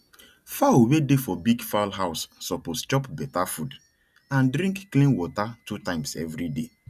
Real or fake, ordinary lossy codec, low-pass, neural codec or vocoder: real; none; 14.4 kHz; none